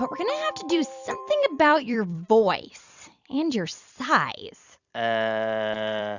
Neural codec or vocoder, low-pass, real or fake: vocoder, 44.1 kHz, 128 mel bands every 256 samples, BigVGAN v2; 7.2 kHz; fake